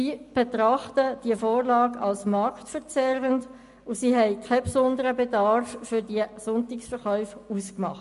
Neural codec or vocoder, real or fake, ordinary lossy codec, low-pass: none; real; MP3, 48 kbps; 14.4 kHz